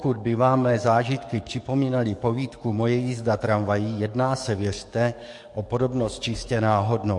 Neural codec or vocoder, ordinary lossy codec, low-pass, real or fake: codec, 44.1 kHz, 7.8 kbps, DAC; MP3, 48 kbps; 10.8 kHz; fake